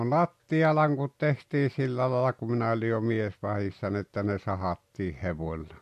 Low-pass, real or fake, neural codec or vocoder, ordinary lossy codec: 14.4 kHz; real; none; MP3, 64 kbps